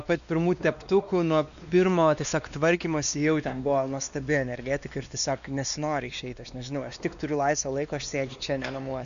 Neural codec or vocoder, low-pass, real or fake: codec, 16 kHz, 2 kbps, X-Codec, WavLM features, trained on Multilingual LibriSpeech; 7.2 kHz; fake